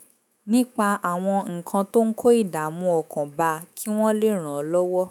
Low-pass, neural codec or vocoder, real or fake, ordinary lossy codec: none; autoencoder, 48 kHz, 128 numbers a frame, DAC-VAE, trained on Japanese speech; fake; none